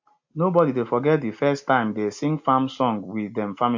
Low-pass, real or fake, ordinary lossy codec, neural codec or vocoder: 7.2 kHz; real; MP3, 48 kbps; none